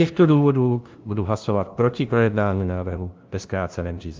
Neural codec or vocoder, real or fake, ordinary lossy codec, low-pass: codec, 16 kHz, 0.5 kbps, FunCodec, trained on LibriTTS, 25 frames a second; fake; Opus, 24 kbps; 7.2 kHz